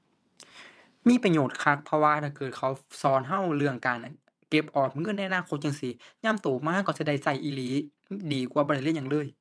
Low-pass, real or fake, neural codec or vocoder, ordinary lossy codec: none; fake; vocoder, 22.05 kHz, 80 mel bands, WaveNeXt; none